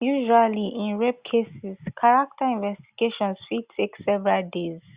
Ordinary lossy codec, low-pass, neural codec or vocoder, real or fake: Opus, 64 kbps; 3.6 kHz; none; real